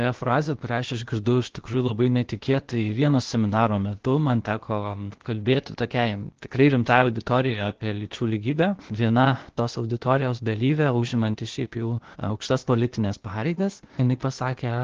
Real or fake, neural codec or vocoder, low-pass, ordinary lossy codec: fake; codec, 16 kHz, 0.8 kbps, ZipCodec; 7.2 kHz; Opus, 16 kbps